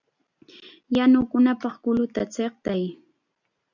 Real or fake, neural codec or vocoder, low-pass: real; none; 7.2 kHz